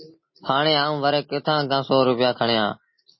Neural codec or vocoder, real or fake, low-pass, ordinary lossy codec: none; real; 7.2 kHz; MP3, 24 kbps